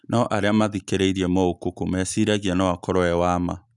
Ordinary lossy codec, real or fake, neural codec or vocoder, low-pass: none; real; none; 10.8 kHz